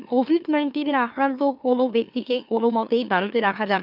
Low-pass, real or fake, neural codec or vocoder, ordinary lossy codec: 5.4 kHz; fake; autoencoder, 44.1 kHz, a latent of 192 numbers a frame, MeloTTS; none